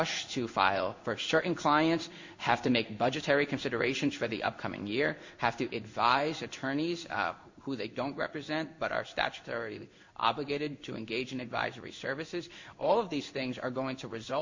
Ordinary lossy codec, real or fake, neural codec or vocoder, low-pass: MP3, 48 kbps; fake; codec, 16 kHz in and 24 kHz out, 1 kbps, XY-Tokenizer; 7.2 kHz